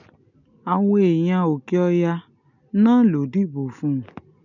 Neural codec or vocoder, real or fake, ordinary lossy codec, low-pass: none; real; none; 7.2 kHz